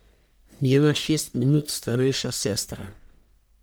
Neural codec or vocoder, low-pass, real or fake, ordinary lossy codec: codec, 44.1 kHz, 1.7 kbps, Pupu-Codec; none; fake; none